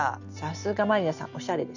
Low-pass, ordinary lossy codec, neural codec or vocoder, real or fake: 7.2 kHz; none; none; real